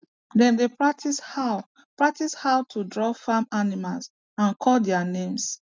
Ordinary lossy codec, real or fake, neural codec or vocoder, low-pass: none; real; none; none